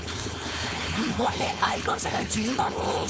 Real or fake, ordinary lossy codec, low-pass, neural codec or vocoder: fake; none; none; codec, 16 kHz, 4.8 kbps, FACodec